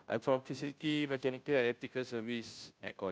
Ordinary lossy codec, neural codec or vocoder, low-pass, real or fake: none; codec, 16 kHz, 0.5 kbps, FunCodec, trained on Chinese and English, 25 frames a second; none; fake